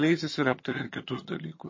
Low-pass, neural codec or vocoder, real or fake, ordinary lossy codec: 7.2 kHz; vocoder, 22.05 kHz, 80 mel bands, HiFi-GAN; fake; MP3, 32 kbps